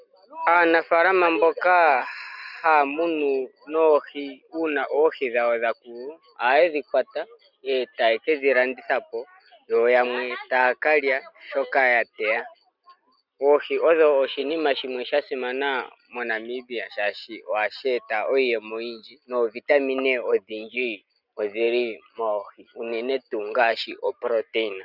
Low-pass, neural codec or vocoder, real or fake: 5.4 kHz; none; real